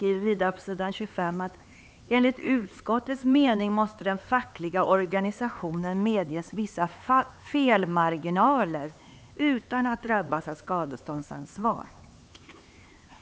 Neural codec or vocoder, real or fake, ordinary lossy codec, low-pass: codec, 16 kHz, 4 kbps, X-Codec, HuBERT features, trained on LibriSpeech; fake; none; none